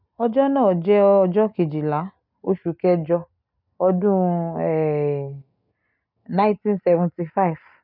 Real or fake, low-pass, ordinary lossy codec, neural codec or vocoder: real; 5.4 kHz; none; none